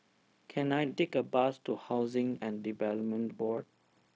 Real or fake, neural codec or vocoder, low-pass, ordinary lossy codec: fake; codec, 16 kHz, 0.4 kbps, LongCat-Audio-Codec; none; none